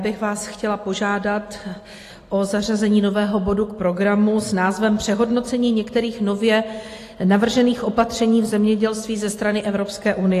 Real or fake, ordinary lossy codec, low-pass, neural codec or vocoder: real; AAC, 48 kbps; 14.4 kHz; none